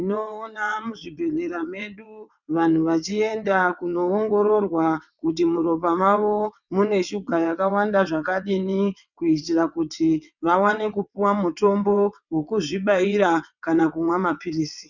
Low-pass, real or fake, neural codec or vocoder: 7.2 kHz; fake; vocoder, 22.05 kHz, 80 mel bands, WaveNeXt